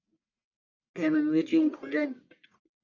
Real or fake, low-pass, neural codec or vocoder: fake; 7.2 kHz; codec, 44.1 kHz, 1.7 kbps, Pupu-Codec